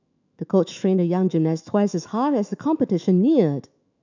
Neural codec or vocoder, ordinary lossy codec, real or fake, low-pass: autoencoder, 48 kHz, 128 numbers a frame, DAC-VAE, trained on Japanese speech; none; fake; 7.2 kHz